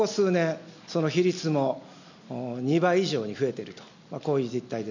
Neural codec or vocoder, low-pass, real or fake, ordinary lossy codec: none; 7.2 kHz; real; none